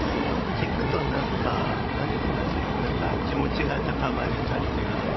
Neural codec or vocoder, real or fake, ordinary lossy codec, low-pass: codec, 16 kHz, 16 kbps, FreqCodec, larger model; fake; MP3, 24 kbps; 7.2 kHz